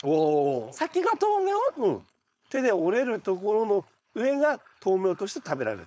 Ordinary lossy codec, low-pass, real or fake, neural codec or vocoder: none; none; fake; codec, 16 kHz, 4.8 kbps, FACodec